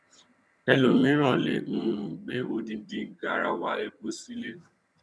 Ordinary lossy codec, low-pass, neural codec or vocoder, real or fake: none; none; vocoder, 22.05 kHz, 80 mel bands, HiFi-GAN; fake